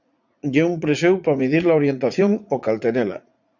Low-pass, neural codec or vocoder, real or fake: 7.2 kHz; vocoder, 44.1 kHz, 80 mel bands, Vocos; fake